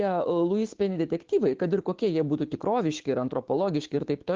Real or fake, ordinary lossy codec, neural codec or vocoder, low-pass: fake; Opus, 16 kbps; codec, 16 kHz, 6 kbps, DAC; 7.2 kHz